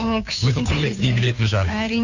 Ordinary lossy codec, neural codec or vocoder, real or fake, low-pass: none; codec, 16 kHz, 2 kbps, FunCodec, trained on Chinese and English, 25 frames a second; fake; 7.2 kHz